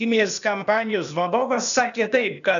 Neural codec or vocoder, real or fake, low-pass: codec, 16 kHz, 0.8 kbps, ZipCodec; fake; 7.2 kHz